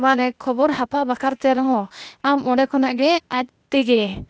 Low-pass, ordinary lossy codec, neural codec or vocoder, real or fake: none; none; codec, 16 kHz, 0.8 kbps, ZipCodec; fake